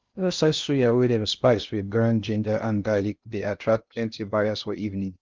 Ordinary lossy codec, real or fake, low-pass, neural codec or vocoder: Opus, 32 kbps; fake; 7.2 kHz; codec, 16 kHz in and 24 kHz out, 0.6 kbps, FocalCodec, streaming, 2048 codes